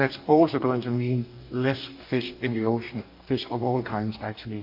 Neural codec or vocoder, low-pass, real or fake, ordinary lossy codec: codec, 44.1 kHz, 2.6 kbps, DAC; 5.4 kHz; fake; MP3, 32 kbps